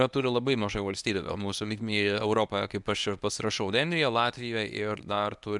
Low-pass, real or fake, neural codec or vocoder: 10.8 kHz; fake; codec, 24 kHz, 0.9 kbps, WavTokenizer, medium speech release version 1